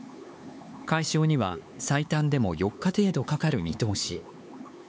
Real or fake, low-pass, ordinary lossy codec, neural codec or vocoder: fake; none; none; codec, 16 kHz, 4 kbps, X-Codec, HuBERT features, trained on LibriSpeech